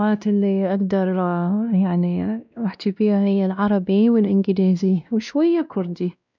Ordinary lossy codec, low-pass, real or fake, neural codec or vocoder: none; 7.2 kHz; fake; codec, 16 kHz, 1 kbps, X-Codec, WavLM features, trained on Multilingual LibriSpeech